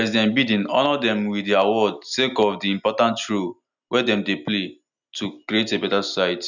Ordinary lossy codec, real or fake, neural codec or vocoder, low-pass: none; real; none; 7.2 kHz